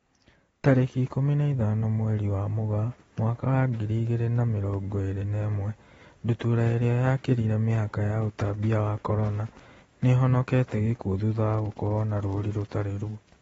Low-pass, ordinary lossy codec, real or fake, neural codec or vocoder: 19.8 kHz; AAC, 24 kbps; real; none